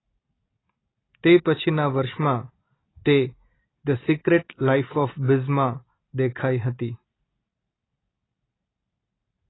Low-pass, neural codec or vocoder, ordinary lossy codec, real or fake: 7.2 kHz; none; AAC, 16 kbps; real